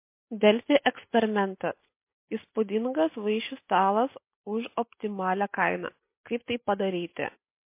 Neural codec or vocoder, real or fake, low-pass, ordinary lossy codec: none; real; 3.6 kHz; MP3, 24 kbps